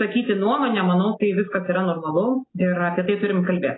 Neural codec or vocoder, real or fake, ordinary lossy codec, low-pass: none; real; AAC, 16 kbps; 7.2 kHz